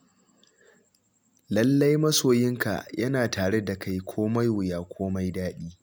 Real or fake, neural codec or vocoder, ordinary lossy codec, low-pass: real; none; none; none